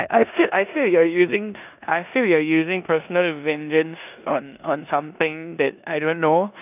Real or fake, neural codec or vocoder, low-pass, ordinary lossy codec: fake; codec, 16 kHz in and 24 kHz out, 0.9 kbps, LongCat-Audio-Codec, four codebook decoder; 3.6 kHz; none